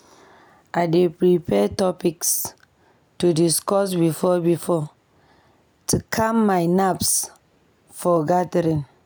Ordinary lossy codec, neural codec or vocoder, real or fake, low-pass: none; none; real; none